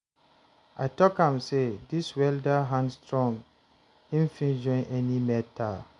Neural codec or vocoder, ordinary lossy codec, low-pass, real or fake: none; none; 10.8 kHz; real